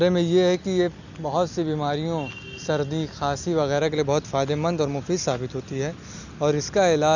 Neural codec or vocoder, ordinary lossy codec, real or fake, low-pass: none; none; real; 7.2 kHz